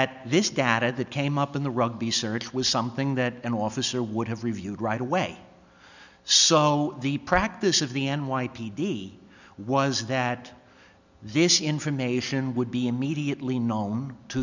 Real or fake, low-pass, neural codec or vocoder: real; 7.2 kHz; none